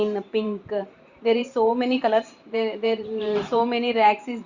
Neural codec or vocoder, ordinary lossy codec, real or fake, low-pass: vocoder, 44.1 kHz, 128 mel bands every 512 samples, BigVGAN v2; Opus, 64 kbps; fake; 7.2 kHz